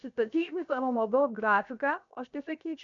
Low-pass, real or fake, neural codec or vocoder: 7.2 kHz; fake; codec, 16 kHz, 0.7 kbps, FocalCodec